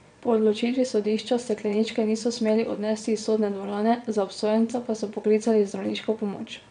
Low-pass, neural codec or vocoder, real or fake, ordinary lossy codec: 9.9 kHz; vocoder, 22.05 kHz, 80 mel bands, Vocos; fake; none